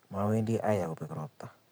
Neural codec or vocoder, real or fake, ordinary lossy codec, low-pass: vocoder, 44.1 kHz, 128 mel bands every 512 samples, BigVGAN v2; fake; none; none